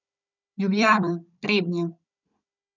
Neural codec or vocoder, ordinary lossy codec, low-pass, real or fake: codec, 16 kHz, 16 kbps, FunCodec, trained on Chinese and English, 50 frames a second; none; 7.2 kHz; fake